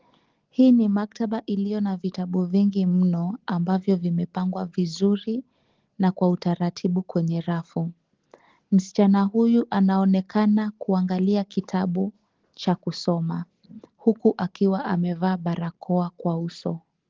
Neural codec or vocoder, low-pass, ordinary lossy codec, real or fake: none; 7.2 kHz; Opus, 16 kbps; real